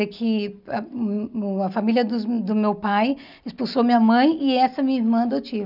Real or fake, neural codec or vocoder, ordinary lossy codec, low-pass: real; none; none; 5.4 kHz